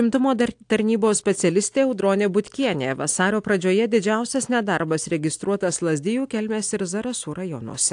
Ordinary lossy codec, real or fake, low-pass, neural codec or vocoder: AAC, 64 kbps; real; 9.9 kHz; none